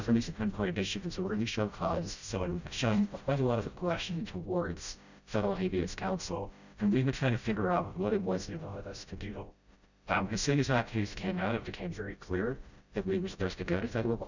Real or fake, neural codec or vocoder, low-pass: fake; codec, 16 kHz, 0.5 kbps, FreqCodec, smaller model; 7.2 kHz